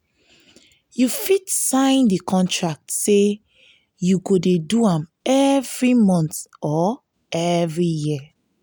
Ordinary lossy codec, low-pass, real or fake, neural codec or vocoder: none; none; real; none